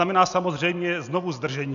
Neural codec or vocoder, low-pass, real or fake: none; 7.2 kHz; real